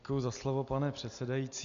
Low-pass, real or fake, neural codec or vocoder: 7.2 kHz; real; none